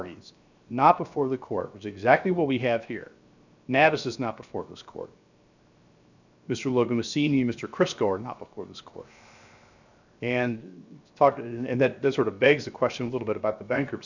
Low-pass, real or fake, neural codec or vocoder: 7.2 kHz; fake; codec, 16 kHz, 0.7 kbps, FocalCodec